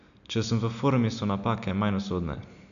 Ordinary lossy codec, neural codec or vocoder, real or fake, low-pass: none; none; real; 7.2 kHz